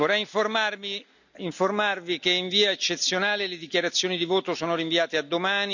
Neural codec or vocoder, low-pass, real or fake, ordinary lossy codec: none; 7.2 kHz; real; none